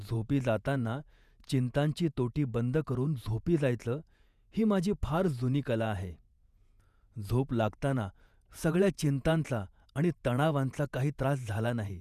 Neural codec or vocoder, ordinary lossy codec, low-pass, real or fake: vocoder, 48 kHz, 128 mel bands, Vocos; none; 14.4 kHz; fake